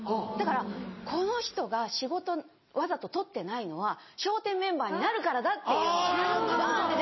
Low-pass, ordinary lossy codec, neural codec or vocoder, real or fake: 7.2 kHz; MP3, 24 kbps; none; real